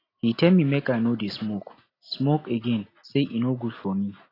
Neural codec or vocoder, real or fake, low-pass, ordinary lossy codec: none; real; 5.4 kHz; AAC, 24 kbps